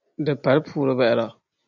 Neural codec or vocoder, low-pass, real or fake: none; 7.2 kHz; real